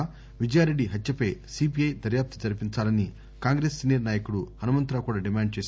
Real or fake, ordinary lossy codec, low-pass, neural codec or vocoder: real; none; none; none